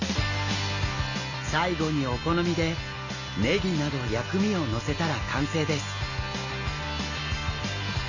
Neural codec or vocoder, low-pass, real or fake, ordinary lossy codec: none; 7.2 kHz; real; none